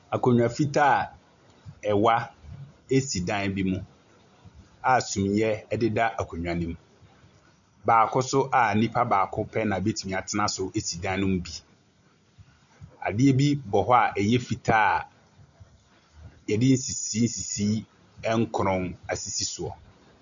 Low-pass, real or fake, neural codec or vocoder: 7.2 kHz; real; none